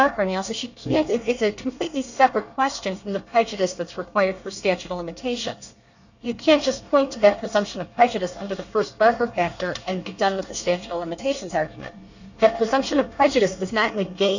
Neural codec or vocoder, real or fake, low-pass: codec, 24 kHz, 1 kbps, SNAC; fake; 7.2 kHz